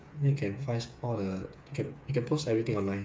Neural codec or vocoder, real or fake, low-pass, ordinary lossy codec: codec, 16 kHz, 8 kbps, FreqCodec, smaller model; fake; none; none